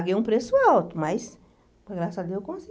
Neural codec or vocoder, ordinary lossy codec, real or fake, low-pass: none; none; real; none